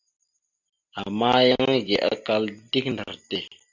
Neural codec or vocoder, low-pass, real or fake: none; 7.2 kHz; real